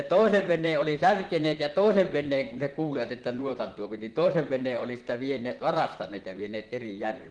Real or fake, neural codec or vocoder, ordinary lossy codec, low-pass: fake; vocoder, 44.1 kHz, 128 mel bands, Pupu-Vocoder; Opus, 16 kbps; 9.9 kHz